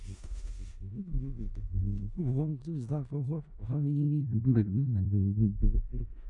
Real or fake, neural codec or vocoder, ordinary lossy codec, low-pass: fake; codec, 16 kHz in and 24 kHz out, 0.4 kbps, LongCat-Audio-Codec, four codebook decoder; MP3, 96 kbps; 10.8 kHz